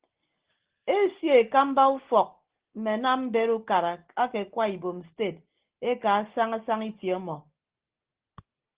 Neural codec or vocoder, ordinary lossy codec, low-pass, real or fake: none; Opus, 16 kbps; 3.6 kHz; real